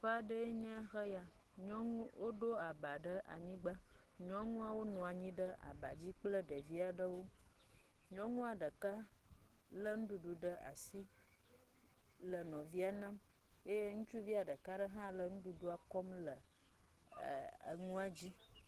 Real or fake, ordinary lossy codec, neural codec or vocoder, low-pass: fake; Opus, 16 kbps; autoencoder, 48 kHz, 128 numbers a frame, DAC-VAE, trained on Japanese speech; 14.4 kHz